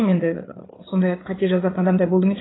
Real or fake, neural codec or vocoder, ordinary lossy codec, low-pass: fake; codec, 16 kHz, 4 kbps, FreqCodec, larger model; AAC, 16 kbps; 7.2 kHz